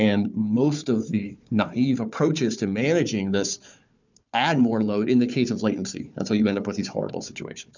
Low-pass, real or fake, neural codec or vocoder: 7.2 kHz; fake; codec, 16 kHz, 4 kbps, FunCodec, trained on Chinese and English, 50 frames a second